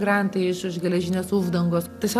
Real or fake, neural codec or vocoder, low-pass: real; none; 14.4 kHz